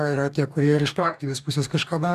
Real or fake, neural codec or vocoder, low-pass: fake; codec, 44.1 kHz, 2.6 kbps, DAC; 14.4 kHz